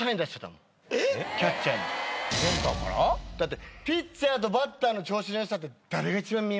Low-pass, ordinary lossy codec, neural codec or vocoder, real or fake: none; none; none; real